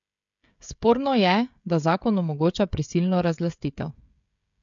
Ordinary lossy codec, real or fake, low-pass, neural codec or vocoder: MP3, 64 kbps; fake; 7.2 kHz; codec, 16 kHz, 16 kbps, FreqCodec, smaller model